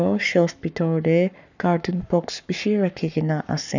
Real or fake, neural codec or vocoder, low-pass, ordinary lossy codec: fake; codec, 44.1 kHz, 7.8 kbps, Pupu-Codec; 7.2 kHz; none